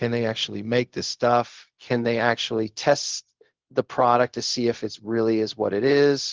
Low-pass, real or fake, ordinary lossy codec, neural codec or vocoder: 7.2 kHz; fake; Opus, 16 kbps; codec, 16 kHz, 0.4 kbps, LongCat-Audio-Codec